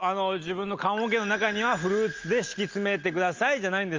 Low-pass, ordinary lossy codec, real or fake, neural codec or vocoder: 7.2 kHz; Opus, 24 kbps; real; none